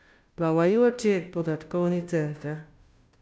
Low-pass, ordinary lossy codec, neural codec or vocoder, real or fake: none; none; codec, 16 kHz, 0.5 kbps, FunCodec, trained on Chinese and English, 25 frames a second; fake